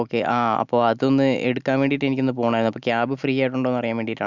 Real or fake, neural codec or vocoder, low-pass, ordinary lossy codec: real; none; 7.2 kHz; none